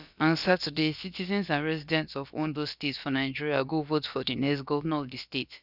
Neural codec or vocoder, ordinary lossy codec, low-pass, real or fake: codec, 16 kHz, about 1 kbps, DyCAST, with the encoder's durations; none; 5.4 kHz; fake